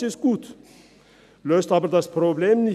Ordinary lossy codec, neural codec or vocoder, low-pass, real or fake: none; none; 14.4 kHz; real